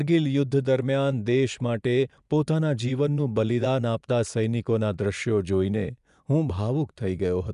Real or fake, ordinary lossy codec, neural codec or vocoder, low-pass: fake; none; vocoder, 24 kHz, 100 mel bands, Vocos; 10.8 kHz